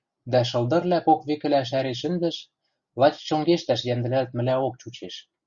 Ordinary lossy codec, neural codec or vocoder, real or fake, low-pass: Opus, 64 kbps; none; real; 7.2 kHz